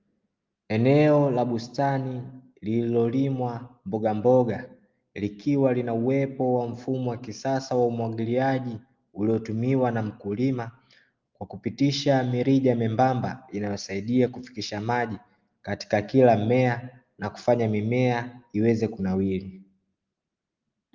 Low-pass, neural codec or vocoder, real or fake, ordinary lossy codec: 7.2 kHz; none; real; Opus, 32 kbps